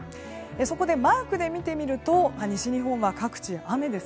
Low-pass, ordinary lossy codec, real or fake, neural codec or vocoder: none; none; real; none